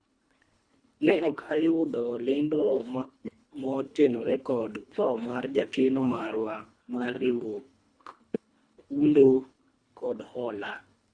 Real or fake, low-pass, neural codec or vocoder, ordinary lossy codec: fake; 9.9 kHz; codec, 24 kHz, 1.5 kbps, HILCodec; Opus, 64 kbps